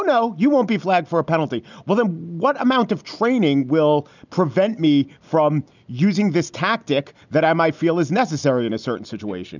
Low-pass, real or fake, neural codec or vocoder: 7.2 kHz; real; none